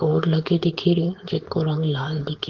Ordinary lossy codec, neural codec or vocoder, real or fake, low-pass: Opus, 16 kbps; codec, 16 kHz, 4.8 kbps, FACodec; fake; 7.2 kHz